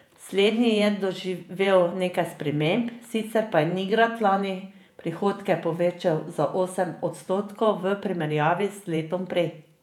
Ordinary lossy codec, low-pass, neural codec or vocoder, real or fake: none; 19.8 kHz; vocoder, 48 kHz, 128 mel bands, Vocos; fake